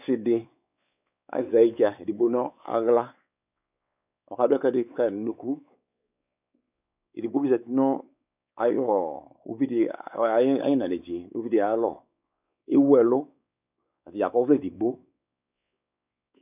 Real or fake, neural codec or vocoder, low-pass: fake; codec, 16 kHz, 4 kbps, X-Codec, WavLM features, trained on Multilingual LibriSpeech; 3.6 kHz